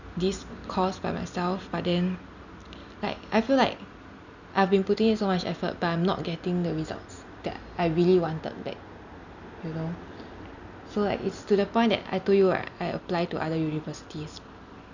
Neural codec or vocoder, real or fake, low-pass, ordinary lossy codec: none; real; 7.2 kHz; none